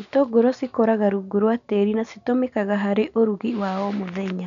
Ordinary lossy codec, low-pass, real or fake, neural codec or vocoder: none; 7.2 kHz; real; none